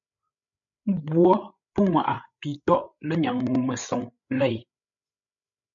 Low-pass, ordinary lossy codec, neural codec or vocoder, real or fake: 7.2 kHz; MP3, 64 kbps; codec, 16 kHz, 8 kbps, FreqCodec, larger model; fake